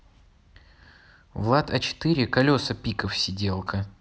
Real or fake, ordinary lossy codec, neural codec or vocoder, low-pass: real; none; none; none